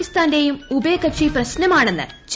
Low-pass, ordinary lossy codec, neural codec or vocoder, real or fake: none; none; none; real